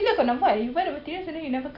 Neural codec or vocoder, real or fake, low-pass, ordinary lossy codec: none; real; 5.4 kHz; none